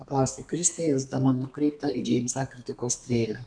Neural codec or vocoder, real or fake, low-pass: codec, 32 kHz, 1.9 kbps, SNAC; fake; 9.9 kHz